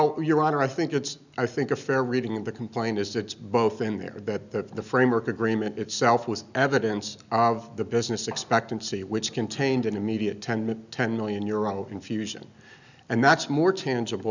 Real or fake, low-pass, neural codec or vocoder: real; 7.2 kHz; none